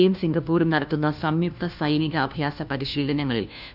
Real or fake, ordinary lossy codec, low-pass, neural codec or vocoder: fake; none; 5.4 kHz; codec, 16 kHz, 1 kbps, FunCodec, trained on LibriTTS, 50 frames a second